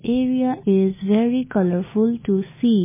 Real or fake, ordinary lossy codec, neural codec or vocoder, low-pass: real; MP3, 16 kbps; none; 3.6 kHz